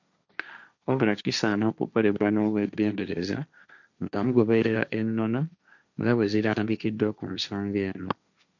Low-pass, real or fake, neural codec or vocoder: 7.2 kHz; fake; codec, 16 kHz, 1.1 kbps, Voila-Tokenizer